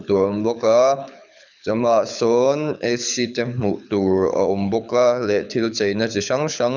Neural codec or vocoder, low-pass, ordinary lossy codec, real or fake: codec, 24 kHz, 6 kbps, HILCodec; 7.2 kHz; none; fake